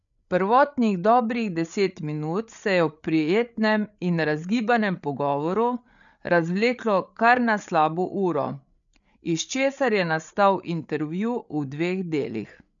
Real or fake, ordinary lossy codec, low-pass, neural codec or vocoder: fake; none; 7.2 kHz; codec, 16 kHz, 8 kbps, FreqCodec, larger model